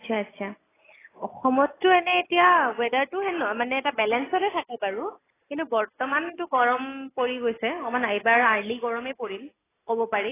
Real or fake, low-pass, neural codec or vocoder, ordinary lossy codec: real; 3.6 kHz; none; AAC, 16 kbps